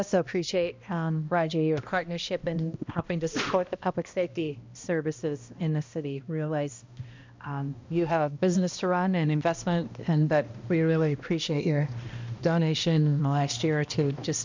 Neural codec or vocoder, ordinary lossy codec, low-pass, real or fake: codec, 16 kHz, 1 kbps, X-Codec, HuBERT features, trained on balanced general audio; MP3, 64 kbps; 7.2 kHz; fake